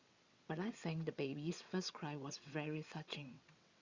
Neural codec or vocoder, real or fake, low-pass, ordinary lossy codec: vocoder, 22.05 kHz, 80 mel bands, WaveNeXt; fake; 7.2 kHz; Opus, 64 kbps